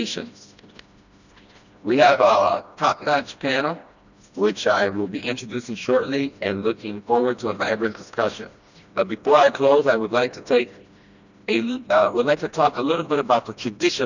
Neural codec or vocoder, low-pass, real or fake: codec, 16 kHz, 1 kbps, FreqCodec, smaller model; 7.2 kHz; fake